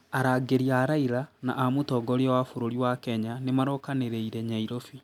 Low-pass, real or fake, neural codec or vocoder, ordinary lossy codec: 19.8 kHz; real; none; none